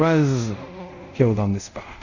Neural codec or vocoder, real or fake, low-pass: codec, 24 kHz, 0.5 kbps, DualCodec; fake; 7.2 kHz